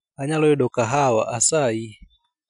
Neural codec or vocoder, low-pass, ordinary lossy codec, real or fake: none; 10.8 kHz; none; real